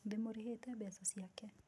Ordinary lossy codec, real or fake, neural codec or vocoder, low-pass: none; real; none; none